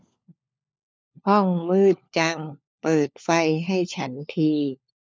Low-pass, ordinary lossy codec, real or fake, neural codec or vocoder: none; none; fake; codec, 16 kHz, 4 kbps, FunCodec, trained on LibriTTS, 50 frames a second